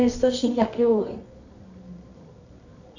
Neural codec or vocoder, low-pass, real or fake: codec, 24 kHz, 0.9 kbps, WavTokenizer, medium music audio release; 7.2 kHz; fake